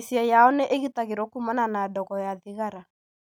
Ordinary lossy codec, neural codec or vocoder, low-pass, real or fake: none; none; none; real